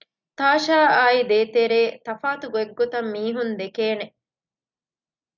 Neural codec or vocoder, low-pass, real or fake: none; 7.2 kHz; real